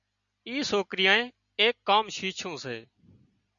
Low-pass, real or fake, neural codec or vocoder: 7.2 kHz; real; none